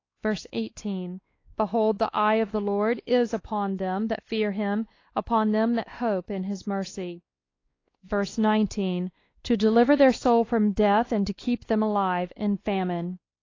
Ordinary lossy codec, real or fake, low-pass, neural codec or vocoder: AAC, 32 kbps; fake; 7.2 kHz; codec, 16 kHz, 2 kbps, X-Codec, WavLM features, trained on Multilingual LibriSpeech